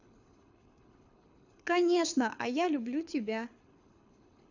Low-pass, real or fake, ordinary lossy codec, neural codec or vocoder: 7.2 kHz; fake; none; codec, 24 kHz, 6 kbps, HILCodec